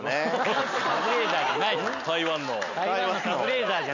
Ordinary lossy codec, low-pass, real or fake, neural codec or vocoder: none; 7.2 kHz; real; none